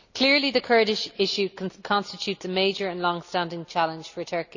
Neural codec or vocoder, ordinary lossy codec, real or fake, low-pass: none; none; real; 7.2 kHz